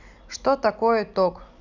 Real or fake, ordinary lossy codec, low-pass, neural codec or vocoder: real; none; 7.2 kHz; none